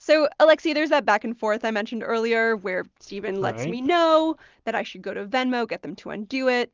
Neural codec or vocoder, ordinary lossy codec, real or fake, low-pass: none; Opus, 24 kbps; real; 7.2 kHz